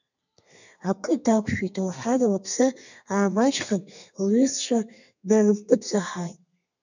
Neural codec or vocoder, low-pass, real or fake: codec, 32 kHz, 1.9 kbps, SNAC; 7.2 kHz; fake